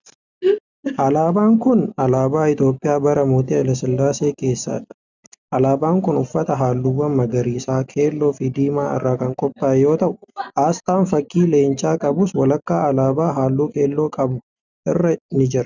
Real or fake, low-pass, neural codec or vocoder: real; 7.2 kHz; none